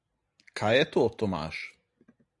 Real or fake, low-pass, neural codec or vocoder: real; 10.8 kHz; none